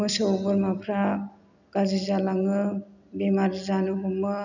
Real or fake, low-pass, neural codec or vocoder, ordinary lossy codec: real; 7.2 kHz; none; none